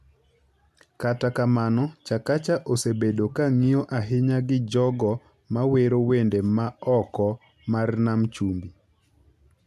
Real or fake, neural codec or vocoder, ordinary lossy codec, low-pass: real; none; none; 14.4 kHz